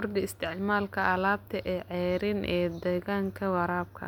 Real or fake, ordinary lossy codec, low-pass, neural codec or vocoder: real; none; 19.8 kHz; none